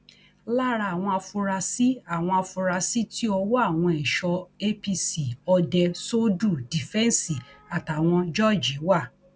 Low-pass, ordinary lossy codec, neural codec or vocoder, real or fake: none; none; none; real